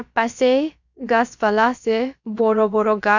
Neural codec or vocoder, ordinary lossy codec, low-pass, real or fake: codec, 16 kHz, about 1 kbps, DyCAST, with the encoder's durations; none; 7.2 kHz; fake